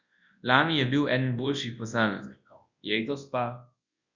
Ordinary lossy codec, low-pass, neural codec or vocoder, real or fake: Opus, 64 kbps; 7.2 kHz; codec, 24 kHz, 0.9 kbps, WavTokenizer, large speech release; fake